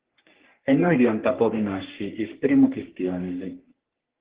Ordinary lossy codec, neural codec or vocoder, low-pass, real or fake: Opus, 16 kbps; codec, 44.1 kHz, 3.4 kbps, Pupu-Codec; 3.6 kHz; fake